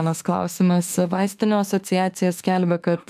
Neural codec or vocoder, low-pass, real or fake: autoencoder, 48 kHz, 32 numbers a frame, DAC-VAE, trained on Japanese speech; 14.4 kHz; fake